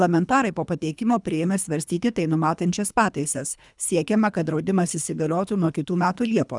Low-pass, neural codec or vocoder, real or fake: 10.8 kHz; codec, 24 kHz, 3 kbps, HILCodec; fake